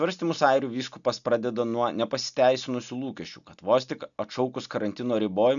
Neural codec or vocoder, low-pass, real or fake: none; 7.2 kHz; real